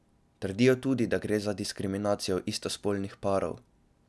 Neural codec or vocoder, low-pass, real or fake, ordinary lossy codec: none; none; real; none